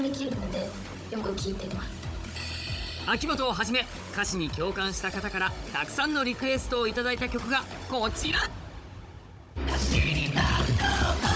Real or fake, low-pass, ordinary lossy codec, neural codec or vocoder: fake; none; none; codec, 16 kHz, 16 kbps, FunCodec, trained on Chinese and English, 50 frames a second